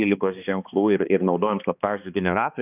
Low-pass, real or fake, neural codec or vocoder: 3.6 kHz; fake; codec, 16 kHz, 2 kbps, X-Codec, HuBERT features, trained on balanced general audio